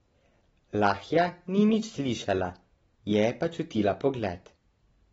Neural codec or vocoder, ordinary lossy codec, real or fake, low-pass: none; AAC, 24 kbps; real; 19.8 kHz